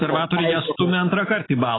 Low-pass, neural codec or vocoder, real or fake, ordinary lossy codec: 7.2 kHz; none; real; AAC, 16 kbps